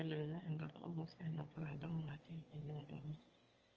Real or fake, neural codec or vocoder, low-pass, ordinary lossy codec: fake; autoencoder, 22.05 kHz, a latent of 192 numbers a frame, VITS, trained on one speaker; 7.2 kHz; Opus, 24 kbps